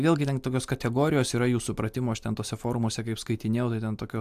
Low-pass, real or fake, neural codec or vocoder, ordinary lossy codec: 14.4 kHz; real; none; AAC, 96 kbps